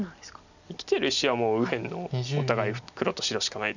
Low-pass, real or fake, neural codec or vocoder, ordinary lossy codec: 7.2 kHz; real; none; none